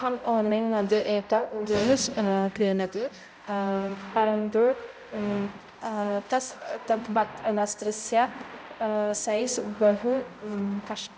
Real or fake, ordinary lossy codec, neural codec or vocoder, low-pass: fake; none; codec, 16 kHz, 0.5 kbps, X-Codec, HuBERT features, trained on balanced general audio; none